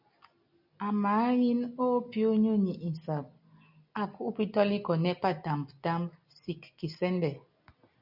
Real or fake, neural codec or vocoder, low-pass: real; none; 5.4 kHz